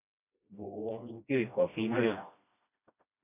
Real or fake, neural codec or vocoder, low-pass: fake; codec, 16 kHz, 1 kbps, FreqCodec, smaller model; 3.6 kHz